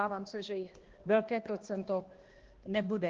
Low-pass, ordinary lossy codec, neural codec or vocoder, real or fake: 7.2 kHz; Opus, 16 kbps; codec, 16 kHz, 1 kbps, X-Codec, HuBERT features, trained on balanced general audio; fake